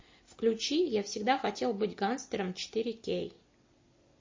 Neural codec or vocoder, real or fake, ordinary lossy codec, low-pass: none; real; MP3, 32 kbps; 7.2 kHz